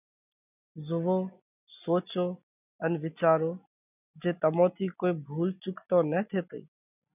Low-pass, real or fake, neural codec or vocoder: 3.6 kHz; real; none